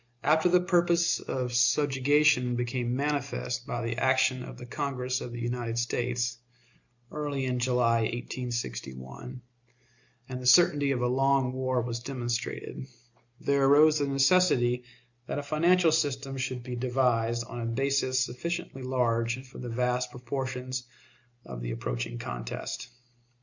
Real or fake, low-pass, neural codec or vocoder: real; 7.2 kHz; none